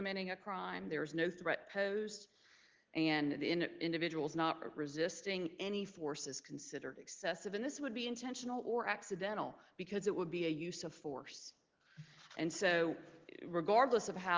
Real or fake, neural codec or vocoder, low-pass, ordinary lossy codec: real; none; 7.2 kHz; Opus, 16 kbps